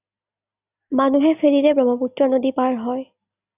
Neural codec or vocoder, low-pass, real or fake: none; 3.6 kHz; real